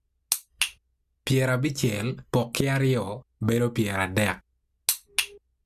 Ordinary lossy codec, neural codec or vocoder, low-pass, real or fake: Opus, 64 kbps; none; 14.4 kHz; real